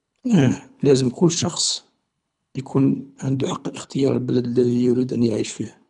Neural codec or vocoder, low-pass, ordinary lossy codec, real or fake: codec, 24 kHz, 3 kbps, HILCodec; 10.8 kHz; none; fake